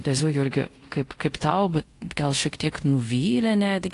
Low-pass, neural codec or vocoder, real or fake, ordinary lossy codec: 10.8 kHz; codec, 24 kHz, 0.5 kbps, DualCodec; fake; AAC, 48 kbps